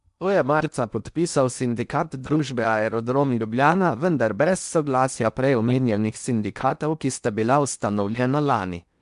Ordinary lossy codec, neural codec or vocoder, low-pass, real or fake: MP3, 96 kbps; codec, 16 kHz in and 24 kHz out, 0.8 kbps, FocalCodec, streaming, 65536 codes; 10.8 kHz; fake